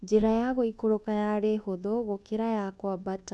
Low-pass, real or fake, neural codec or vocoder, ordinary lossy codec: none; fake; codec, 24 kHz, 1.2 kbps, DualCodec; none